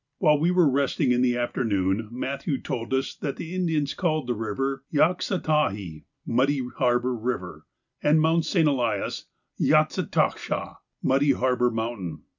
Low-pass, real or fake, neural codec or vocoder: 7.2 kHz; real; none